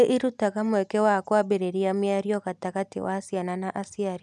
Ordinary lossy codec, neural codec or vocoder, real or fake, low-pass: none; none; real; none